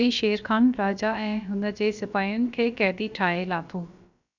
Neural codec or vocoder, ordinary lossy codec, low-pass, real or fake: codec, 16 kHz, about 1 kbps, DyCAST, with the encoder's durations; none; 7.2 kHz; fake